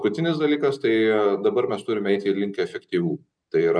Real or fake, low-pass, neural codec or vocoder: real; 9.9 kHz; none